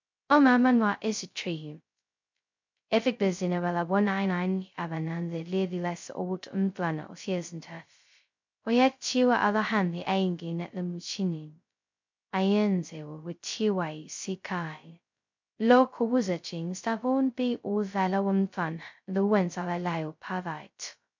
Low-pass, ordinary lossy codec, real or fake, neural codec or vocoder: 7.2 kHz; MP3, 64 kbps; fake; codec, 16 kHz, 0.2 kbps, FocalCodec